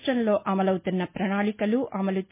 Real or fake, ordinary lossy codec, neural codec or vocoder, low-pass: real; MP3, 24 kbps; none; 3.6 kHz